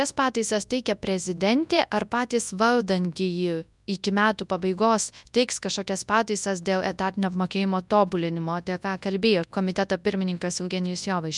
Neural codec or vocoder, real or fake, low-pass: codec, 24 kHz, 0.9 kbps, WavTokenizer, large speech release; fake; 10.8 kHz